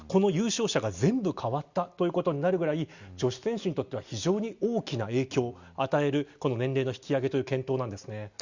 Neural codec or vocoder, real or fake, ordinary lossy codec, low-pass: none; real; Opus, 64 kbps; 7.2 kHz